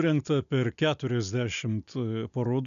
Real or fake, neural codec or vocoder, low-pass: real; none; 7.2 kHz